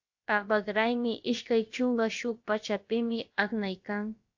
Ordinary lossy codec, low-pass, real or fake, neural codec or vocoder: AAC, 48 kbps; 7.2 kHz; fake; codec, 16 kHz, about 1 kbps, DyCAST, with the encoder's durations